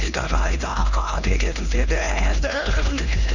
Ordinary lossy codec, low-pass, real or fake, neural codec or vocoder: none; 7.2 kHz; fake; codec, 16 kHz, 1 kbps, X-Codec, HuBERT features, trained on LibriSpeech